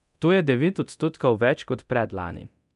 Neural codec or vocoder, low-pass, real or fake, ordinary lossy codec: codec, 24 kHz, 0.9 kbps, DualCodec; 10.8 kHz; fake; none